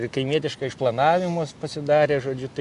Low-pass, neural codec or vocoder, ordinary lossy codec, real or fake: 10.8 kHz; none; AAC, 64 kbps; real